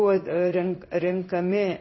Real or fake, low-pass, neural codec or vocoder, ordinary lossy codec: fake; 7.2 kHz; codec, 16 kHz in and 24 kHz out, 1 kbps, XY-Tokenizer; MP3, 24 kbps